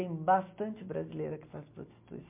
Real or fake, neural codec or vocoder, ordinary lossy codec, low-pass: real; none; MP3, 32 kbps; 3.6 kHz